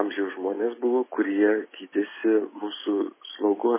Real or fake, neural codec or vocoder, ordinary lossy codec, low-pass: real; none; MP3, 16 kbps; 3.6 kHz